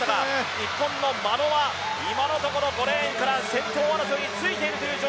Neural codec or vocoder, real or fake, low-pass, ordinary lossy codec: none; real; none; none